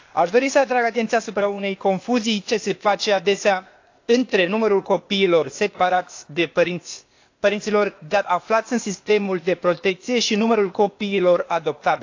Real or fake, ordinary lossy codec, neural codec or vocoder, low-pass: fake; AAC, 48 kbps; codec, 16 kHz, 0.8 kbps, ZipCodec; 7.2 kHz